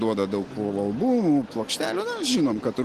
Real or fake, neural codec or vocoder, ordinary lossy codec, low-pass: real; none; Opus, 16 kbps; 14.4 kHz